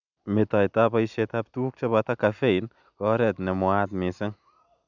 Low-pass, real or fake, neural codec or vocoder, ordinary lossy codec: 7.2 kHz; real; none; none